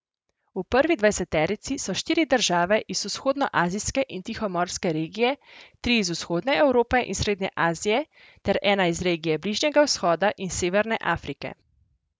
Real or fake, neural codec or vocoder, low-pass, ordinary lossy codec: real; none; none; none